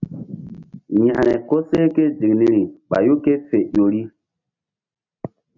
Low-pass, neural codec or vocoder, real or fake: 7.2 kHz; none; real